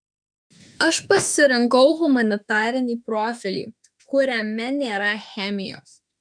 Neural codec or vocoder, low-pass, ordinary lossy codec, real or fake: autoencoder, 48 kHz, 32 numbers a frame, DAC-VAE, trained on Japanese speech; 9.9 kHz; MP3, 96 kbps; fake